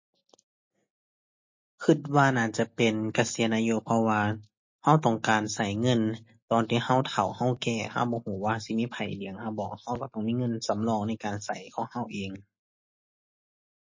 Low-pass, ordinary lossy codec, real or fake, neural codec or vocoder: 7.2 kHz; MP3, 32 kbps; real; none